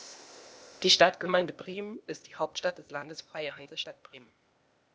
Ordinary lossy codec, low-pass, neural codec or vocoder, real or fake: none; none; codec, 16 kHz, 0.8 kbps, ZipCodec; fake